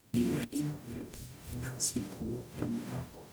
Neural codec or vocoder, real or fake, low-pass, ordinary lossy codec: codec, 44.1 kHz, 0.9 kbps, DAC; fake; none; none